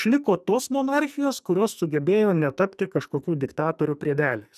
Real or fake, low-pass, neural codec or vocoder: fake; 14.4 kHz; codec, 44.1 kHz, 2.6 kbps, SNAC